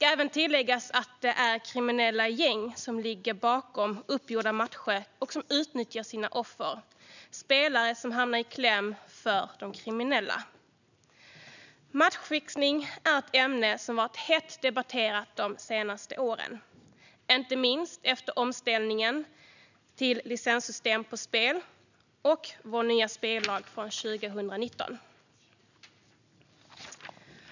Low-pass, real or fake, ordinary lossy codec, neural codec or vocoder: 7.2 kHz; real; none; none